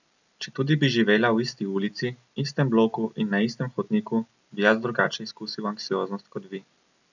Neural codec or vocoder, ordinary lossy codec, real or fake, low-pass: none; none; real; 7.2 kHz